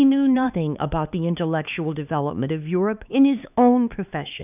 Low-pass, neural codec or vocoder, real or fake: 3.6 kHz; codec, 16 kHz, 4 kbps, X-Codec, HuBERT features, trained on LibriSpeech; fake